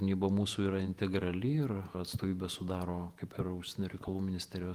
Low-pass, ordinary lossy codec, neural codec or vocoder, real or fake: 14.4 kHz; Opus, 32 kbps; vocoder, 48 kHz, 128 mel bands, Vocos; fake